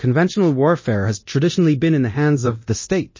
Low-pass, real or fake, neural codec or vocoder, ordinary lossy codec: 7.2 kHz; fake; codec, 24 kHz, 0.9 kbps, DualCodec; MP3, 32 kbps